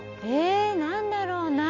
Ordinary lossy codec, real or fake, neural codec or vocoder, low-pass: none; real; none; 7.2 kHz